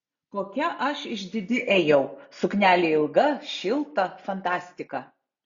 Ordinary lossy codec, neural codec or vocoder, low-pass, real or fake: Opus, 64 kbps; none; 7.2 kHz; real